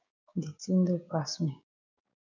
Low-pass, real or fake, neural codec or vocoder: 7.2 kHz; fake; codec, 16 kHz, 6 kbps, DAC